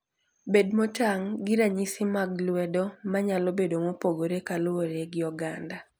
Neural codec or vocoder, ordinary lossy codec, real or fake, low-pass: none; none; real; none